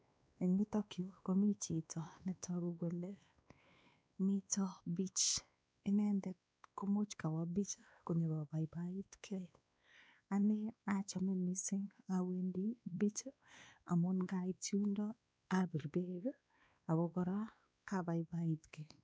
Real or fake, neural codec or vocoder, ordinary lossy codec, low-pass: fake; codec, 16 kHz, 2 kbps, X-Codec, WavLM features, trained on Multilingual LibriSpeech; none; none